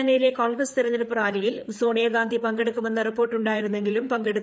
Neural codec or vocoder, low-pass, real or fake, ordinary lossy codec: codec, 16 kHz, 4 kbps, FreqCodec, larger model; none; fake; none